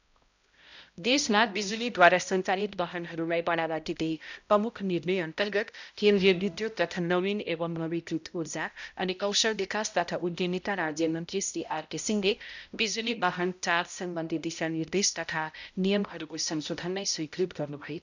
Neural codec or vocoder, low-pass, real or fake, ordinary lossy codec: codec, 16 kHz, 0.5 kbps, X-Codec, HuBERT features, trained on balanced general audio; 7.2 kHz; fake; none